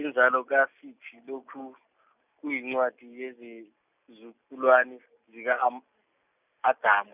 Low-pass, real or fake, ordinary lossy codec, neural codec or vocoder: 3.6 kHz; real; none; none